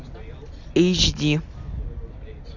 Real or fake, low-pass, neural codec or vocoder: real; 7.2 kHz; none